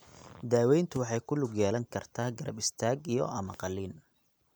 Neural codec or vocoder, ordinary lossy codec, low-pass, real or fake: none; none; none; real